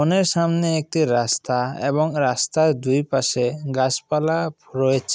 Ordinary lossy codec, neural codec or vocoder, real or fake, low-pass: none; none; real; none